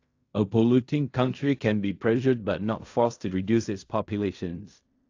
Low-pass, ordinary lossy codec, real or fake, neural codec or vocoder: 7.2 kHz; AAC, 48 kbps; fake; codec, 16 kHz in and 24 kHz out, 0.4 kbps, LongCat-Audio-Codec, fine tuned four codebook decoder